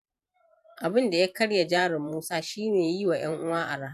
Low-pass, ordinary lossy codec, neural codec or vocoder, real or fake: 14.4 kHz; none; vocoder, 44.1 kHz, 128 mel bands every 512 samples, BigVGAN v2; fake